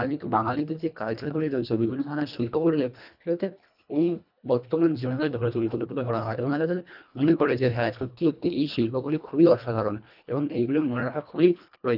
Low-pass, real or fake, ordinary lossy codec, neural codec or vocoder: 5.4 kHz; fake; none; codec, 24 kHz, 1.5 kbps, HILCodec